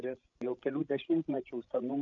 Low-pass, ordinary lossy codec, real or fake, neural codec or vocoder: 7.2 kHz; AAC, 64 kbps; fake; codec, 16 kHz, 16 kbps, FreqCodec, larger model